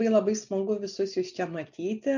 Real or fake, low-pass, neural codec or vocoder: real; 7.2 kHz; none